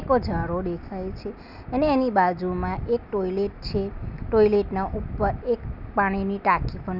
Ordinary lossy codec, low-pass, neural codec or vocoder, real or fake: none; 5.4 kHz; none; real